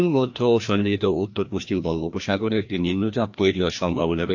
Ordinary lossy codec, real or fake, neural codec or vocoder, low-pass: AAC, 48 kbps; fake; codec, 16 kHz, 1 kbps, FreqCodec, larger model; 7.2 kHz